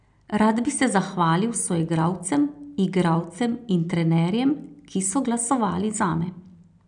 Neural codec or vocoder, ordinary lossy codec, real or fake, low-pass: none; none; real; 9.9 kHz